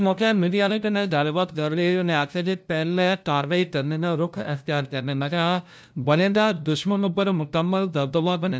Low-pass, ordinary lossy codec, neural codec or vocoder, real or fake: none; none; codec, 16 kHz, 0.5 kbps, FunCodec, trained on LibriTTS, 25 frames a second; fake